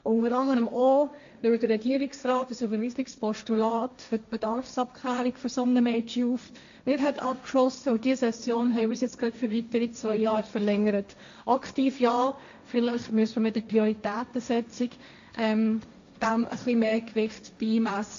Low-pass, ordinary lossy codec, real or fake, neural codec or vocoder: 7.2 kHz; AAC, 64 kbps; fake; codec, 16 kHz, 1.1 kbps, Voila-Tokenizer